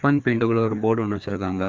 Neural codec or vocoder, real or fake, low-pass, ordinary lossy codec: codec, 16 kHz, 2 kbps, FreqCodec, larger model; fake; none; none